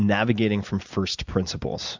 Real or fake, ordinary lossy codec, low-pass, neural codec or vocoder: real; MP3, 64 kbps; 7.2 kHz; none